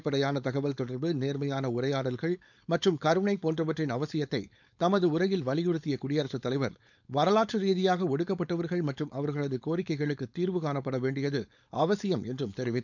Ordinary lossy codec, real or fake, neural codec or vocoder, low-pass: none; fake; codec, 16 kHz, 4.8 kbps, FACodec; 7.2 kHz